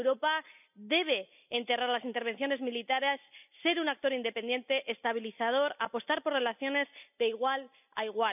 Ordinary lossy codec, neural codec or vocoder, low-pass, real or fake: none; none; 3.6 kHz; real